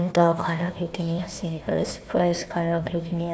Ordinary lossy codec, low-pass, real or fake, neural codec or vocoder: none; none; fake; codec, 16 kHz, 1 kbps, FunCodec, trained on Chinese and English, 50 frames a second